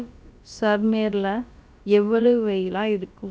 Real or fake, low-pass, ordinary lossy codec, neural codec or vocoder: fake; none; none; codec, 16 kHz, about 1 kbps, DyCAST, with the encoder's durations